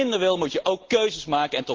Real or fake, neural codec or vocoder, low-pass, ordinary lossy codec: real; none; 7.2 kHz; Opus, 16 kbps